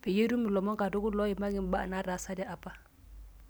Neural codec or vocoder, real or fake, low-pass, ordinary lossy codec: none; real; none; none